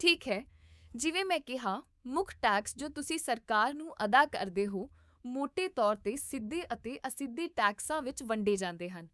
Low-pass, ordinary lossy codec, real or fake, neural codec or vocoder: none; none; fake; codec, 24 kHz, 3.1 kbps, DualCodec